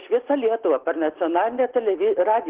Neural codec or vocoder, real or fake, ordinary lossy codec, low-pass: none; real; Opus, 16 kbps; 3.6 kHz